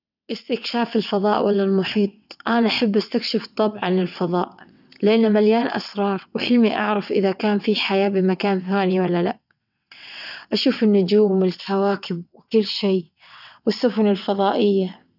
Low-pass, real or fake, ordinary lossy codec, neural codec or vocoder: 5.4 kHz; fake; none; vocoder, 22.05 kHz, 80 mel bands, WaveNeXt